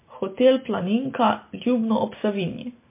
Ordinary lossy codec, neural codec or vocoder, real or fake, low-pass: MP3, 24 kbps; none; real; 3.6 kHz